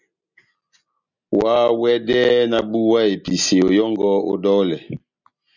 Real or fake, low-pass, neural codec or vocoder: real; 7.2 kHz; none